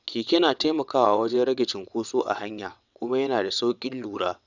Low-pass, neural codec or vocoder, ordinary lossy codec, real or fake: 7.2 kHz; vocoder, 22.05 kHz, 80 mel bands, WaveNeXt; none; fake